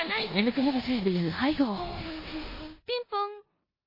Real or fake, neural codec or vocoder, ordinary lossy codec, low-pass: fake; codec, 16 kHz in and 24 kHz out, 0.9 kbps, LongCat-Audio-Codec, four codebook decoder; MP3, 24 kbps; 5.4 kHz